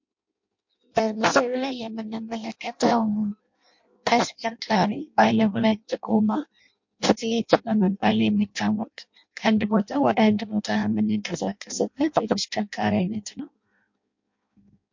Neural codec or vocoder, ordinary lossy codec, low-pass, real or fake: codec, 16 kHz in and 24 kHz out, 0.6 kbps, FireRedTTS-2 codec; MP3, 48 kbps; 7.2 kHz; fake